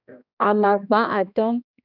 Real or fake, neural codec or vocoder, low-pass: fake; codec, 16 kHz, 1 kbps, X-Codec, HuBERT features, trained on balanced general audio; 5.4 kHz